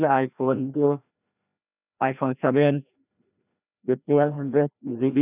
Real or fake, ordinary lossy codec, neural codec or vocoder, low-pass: fake; none; codec, 16 kHz, 1 kbps, FreqCodec, larger model; 3.6 kHz